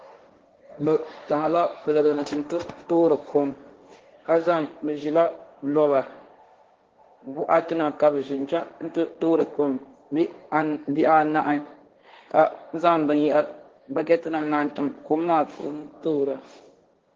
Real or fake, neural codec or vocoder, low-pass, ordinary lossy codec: fake; codec, 16 kHz, 1.1 kbps, Voila-Tokenizer; 7.2 kHz; Opus, 16 kbps